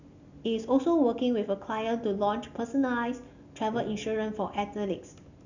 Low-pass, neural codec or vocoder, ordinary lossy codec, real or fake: 7.2 kHz; none; none; real